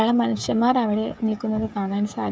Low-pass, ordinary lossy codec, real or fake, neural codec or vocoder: none; none; fake; codec, 16 kHz, 16 kbps, FreqCodec, smaller model